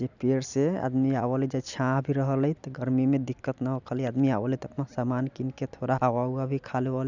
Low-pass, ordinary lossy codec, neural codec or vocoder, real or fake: 7.2 kHz; none; none; real